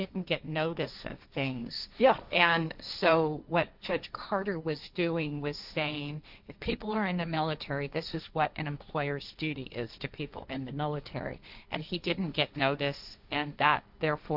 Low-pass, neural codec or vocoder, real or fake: 5.4 kHz; codec, 16 kHz, 1.1 kbps, Voila-Tokenizer; fake